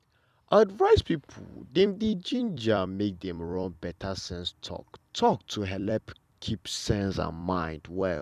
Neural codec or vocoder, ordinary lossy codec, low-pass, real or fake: vocoder, 44.1 kHz, 128 mel bands every 256 samples, BigVGAN v2; none; 14.4 kHz; fake